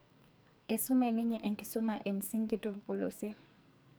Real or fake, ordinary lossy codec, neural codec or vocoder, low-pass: fake; none; codec, 44.1 kHz, 2.6 kbps, SNAC; none